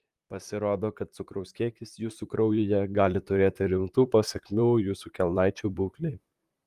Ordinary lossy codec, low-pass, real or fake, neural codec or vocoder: Opus, 32 kbps; 14.4 kHz; fake; vocoder, 44.1 kHz, 128 mel bands, Pupu-Vocoder